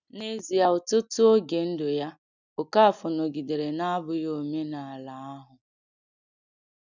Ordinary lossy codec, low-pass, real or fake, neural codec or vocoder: none; 7.2 kHz; real; none